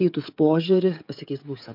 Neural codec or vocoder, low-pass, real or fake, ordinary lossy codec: codec, 16 kHz, 16 kbps, FreqCodec, smaller model; 5.4 kHz; fake; MP3, 48 kbps